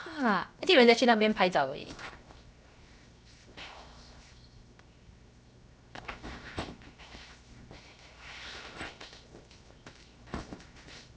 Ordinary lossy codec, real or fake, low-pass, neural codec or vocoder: none; fake; none; codec, 16 kHz, 0.7 kbps, FocalCodec